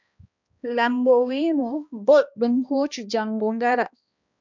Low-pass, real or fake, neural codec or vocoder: 7.2 kHz; fake; codec, 16 kHz, 1 kbps, X-Codec, HuBERT features, trained on balanced general audio